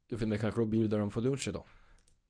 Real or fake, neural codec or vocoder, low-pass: fake; codec, 24 kHz, 0.9 kbps, WavTokenizer, medium speech release version 1; 9.9 kHz